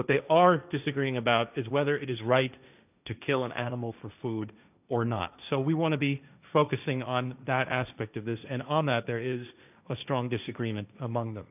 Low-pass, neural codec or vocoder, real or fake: 3.6 kHz; codec, 16 kHz, 1.1 kbps, Voila-Tokenizer; fake